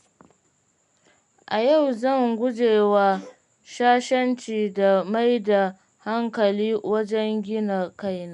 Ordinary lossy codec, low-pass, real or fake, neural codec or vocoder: none; 10.8 kHz; real; none